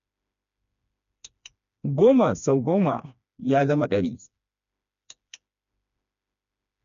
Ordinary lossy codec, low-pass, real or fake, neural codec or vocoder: none; 7.2 kHz; fake; codec, 16 kHz, 2 kbps, FreqCodec, smaller model